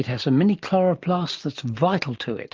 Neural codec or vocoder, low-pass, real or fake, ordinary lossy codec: none; 7.2 kHz; real; Opus, 32 kbps